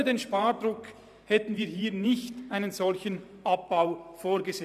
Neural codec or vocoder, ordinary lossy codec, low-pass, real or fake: vocoder, 44.1 kHz, 128 mel bands every 512 samples, BigVGAN v2; none; 14.4 kHz; fake